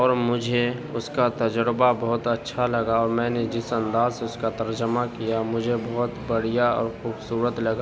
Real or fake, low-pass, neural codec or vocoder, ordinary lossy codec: real; none; none; none